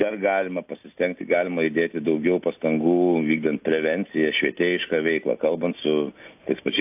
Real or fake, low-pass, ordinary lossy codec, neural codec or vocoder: real; 3.6 kHz; AAC, 32 kbps; none